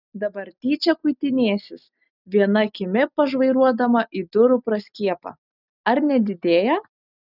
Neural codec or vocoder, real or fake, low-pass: none; real; 5.4 kHz